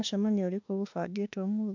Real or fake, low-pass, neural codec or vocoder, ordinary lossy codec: fake; 7.2 kHz; autoencoder, 48 kHz, 32 numbers a frame, DAC-VAE, trained on Japanese speech; MP3, 64 kbps